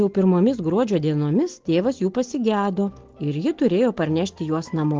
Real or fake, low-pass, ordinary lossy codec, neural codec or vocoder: real; 7.2 kHz; Opus, 16 kbps; none